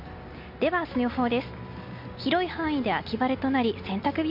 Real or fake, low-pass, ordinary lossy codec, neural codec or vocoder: real; 5.4 kHz; MP3, 48 kbps; none